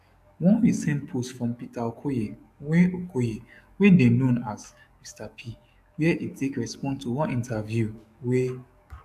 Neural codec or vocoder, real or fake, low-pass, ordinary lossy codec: codec, 44.1 kHz, 7.8 kbps, DAC; fake; 14.4 kHz; AAC, 96 kbps